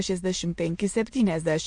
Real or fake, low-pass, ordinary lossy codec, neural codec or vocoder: fake; 9.9 kHz; MP3, 48 kbps; autoencoder, 22.05 kHz, a latent of 192 numbers a frame, VITS, trained on many speakers